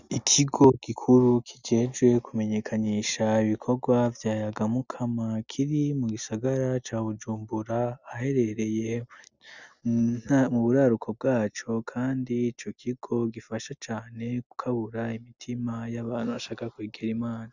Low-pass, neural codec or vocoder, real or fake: 7.2 kHz; none; real